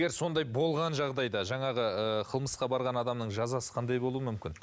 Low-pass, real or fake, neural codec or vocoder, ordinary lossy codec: none; real; none; none